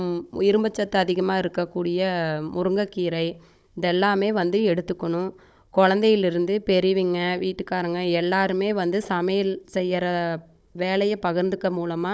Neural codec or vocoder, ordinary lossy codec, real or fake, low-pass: codec, 16 kHz, 16 kbps, FunCodec, trained on Chinese and English, 50 frames a second; none; fake; none